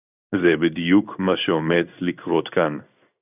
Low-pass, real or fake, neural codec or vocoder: 3.6 kHz; fake; codec, 16 kHz in and 24 kHz out, 1 kbps, XY-Tokenizer